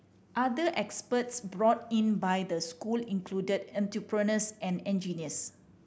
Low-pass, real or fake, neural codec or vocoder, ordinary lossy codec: none; real; none; none